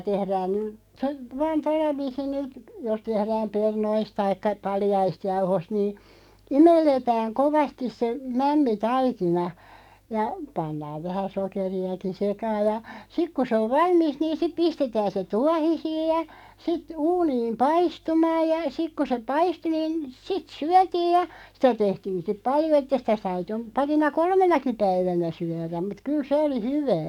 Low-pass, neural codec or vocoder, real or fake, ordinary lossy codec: 19.8 kHz; codec, 44.1 kHz, 7.8 kbps, DAC; fake; none